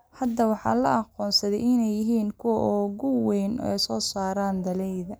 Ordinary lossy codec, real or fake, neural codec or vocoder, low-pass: none; real; none; none